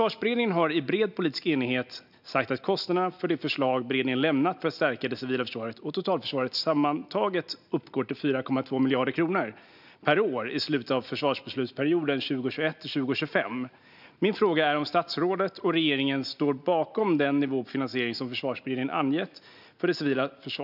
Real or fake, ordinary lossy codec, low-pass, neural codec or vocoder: real; none; 5.4 kHz; none